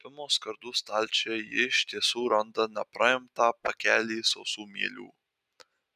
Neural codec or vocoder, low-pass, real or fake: none; 14.4 kHz; real